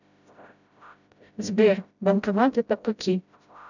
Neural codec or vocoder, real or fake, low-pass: codec, 16 kHz, 0.5 kbps, FreqCodec, smaller model; fake; 7.2 kHz